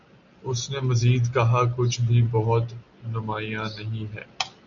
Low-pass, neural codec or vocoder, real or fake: 7.2 kHz; none; real